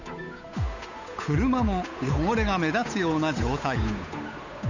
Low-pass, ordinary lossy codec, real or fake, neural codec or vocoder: 7.2 kHz; none; fake; codec, 16 kHz, 8 kbps, FunCodec, trained on Chinese and English, 25 frames a second